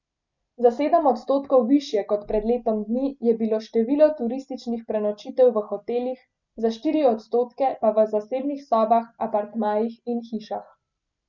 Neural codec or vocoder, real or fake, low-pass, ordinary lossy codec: none; real; 7.2 kHz; none